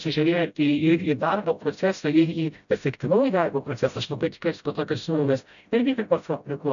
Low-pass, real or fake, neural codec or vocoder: 7.2 kHz; fake; codec, 16 kHz, 0.5 kbps, FreqCodec, smaller model